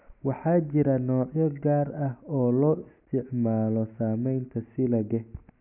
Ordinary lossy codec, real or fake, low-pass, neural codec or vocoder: none; real; 3.6 kHz; none